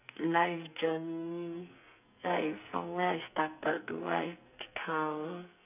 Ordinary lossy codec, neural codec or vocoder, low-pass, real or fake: none; codec, 44.1 kHz, 2.6 kbps, SNAC; 3.6 kHz; fake